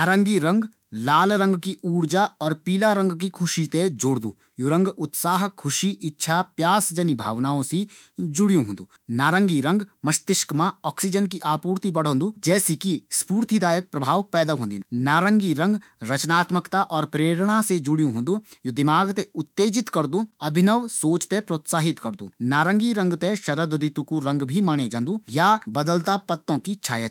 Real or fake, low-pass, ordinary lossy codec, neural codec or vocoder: fake; 19.8 kHz; none; autoencoder, 48 kHz, 32 numbers a frame, DAC-VAE, trained on Japanese speech